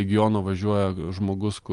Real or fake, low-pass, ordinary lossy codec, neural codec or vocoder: real; 10.8 kHz; Opus, 32 kbps; none